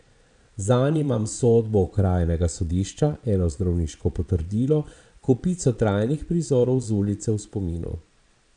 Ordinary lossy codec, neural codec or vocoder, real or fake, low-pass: none; vocoder, 22.05 kHz, 80 mel bands, Vocos; fake; 9.9 kHz